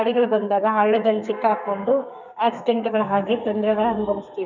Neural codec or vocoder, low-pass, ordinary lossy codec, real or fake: codec, 32 kHz, 1.9 kbps, SNAC; 7.2 kHz; none; fake